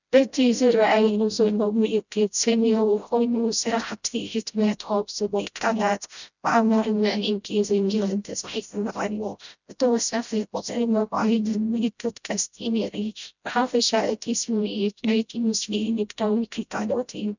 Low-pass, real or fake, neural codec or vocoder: 7.2 kHz; fake; codec, 16 kHz, 0.5 kbps, FreqCodec, smaller model